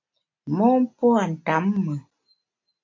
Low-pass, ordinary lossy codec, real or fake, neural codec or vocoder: 7.2 kHz; MP3, 48 kbps; real; none